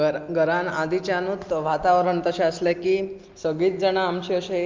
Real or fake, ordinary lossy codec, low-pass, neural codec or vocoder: real; Opus, 24 kbps; 7.2 kHz; none